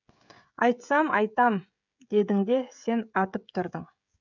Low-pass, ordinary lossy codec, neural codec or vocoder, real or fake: 7.2 kHz; none; codec, 16 kHz, 16 kbps, FreqCodec, smaller model; fake